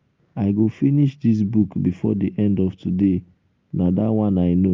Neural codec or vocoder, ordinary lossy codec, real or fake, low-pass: none; Opus, 32 kbps; real; 7.2 kHz